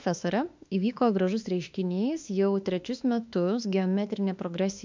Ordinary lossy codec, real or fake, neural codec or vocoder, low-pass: MP3, 64 kbps; fake; autoencoder, 48 kHz, 32 numbers a frame, DAC-VAE, trained on Japanese speech; 7.2 kHz